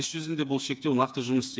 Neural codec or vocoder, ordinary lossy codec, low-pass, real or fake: codec, 16 kHz, 4 kbps, FreqCodec, smaller model; none; none; fake